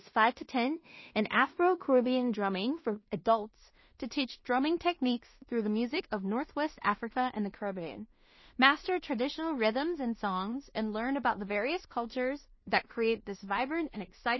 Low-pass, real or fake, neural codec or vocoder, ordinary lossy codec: 7.2 kHz; fake; codec, 16 kHz in and 24 kHz out, 0.4 kbps, LongCat-Audio-Codec, two codebook decoder; MP3, 24 kbps